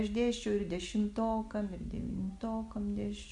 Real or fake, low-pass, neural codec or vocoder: real; 10.8 kHz; none